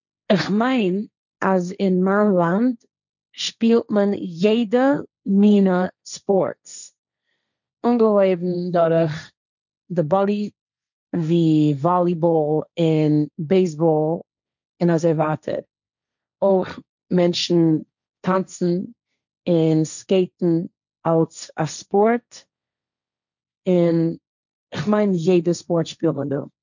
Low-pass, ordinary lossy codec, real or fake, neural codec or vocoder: 7.2 kHz; none; fake; codec, 16 kHz, 1.1 kbps, Voila-Tokenizer